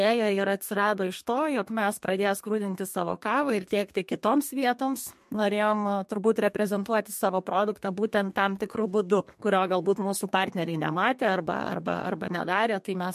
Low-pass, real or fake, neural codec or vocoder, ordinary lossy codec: 14.4 kHz; fake; codec, 32 kHz, 1.9 kbps, SNAC; MP3, 64 kbps